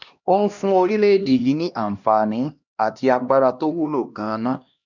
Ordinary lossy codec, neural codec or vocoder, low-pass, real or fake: none; codec, 16 kHz, 1 kbps, X-Codec, HuBERT features, trained on LibriSpeech; 7.2 kHz; fake